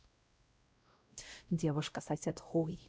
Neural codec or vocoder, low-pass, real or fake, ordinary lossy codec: codec, 16 kHz, 0.5 kbps, X-Codec, WavLM features, trained on Multilingual LibriSpeech; none; fake; none